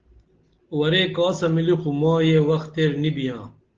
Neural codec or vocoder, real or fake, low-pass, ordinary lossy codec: none; real; 7.2 kHz; Opus, 16 kbps